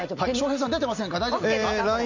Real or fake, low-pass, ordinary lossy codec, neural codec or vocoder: real; 7.2 kHz; MP3, 64 kbps; none